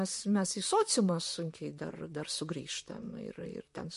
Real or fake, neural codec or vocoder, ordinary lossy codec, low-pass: fake; vocoder, 44.1 kHz, 128 mel bands, Pupu-Vocoder; MP3, 48 kbps; 14.4 kHz